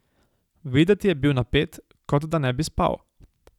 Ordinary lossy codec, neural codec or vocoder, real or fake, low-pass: none; none; real; 19.8 kHz